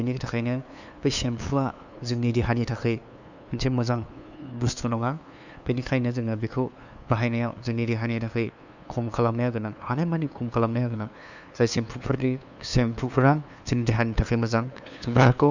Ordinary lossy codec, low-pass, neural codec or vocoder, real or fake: none; 7.2 kHz; codec, 16 kHz, 2 kbps, FunCodec, trained on LibriTTS, 25 frames a second; fake